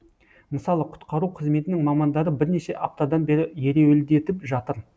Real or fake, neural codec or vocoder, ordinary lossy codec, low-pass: real; none; none; none